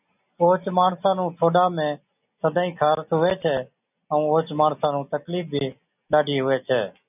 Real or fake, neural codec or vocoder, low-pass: real; none; 3.6 kHz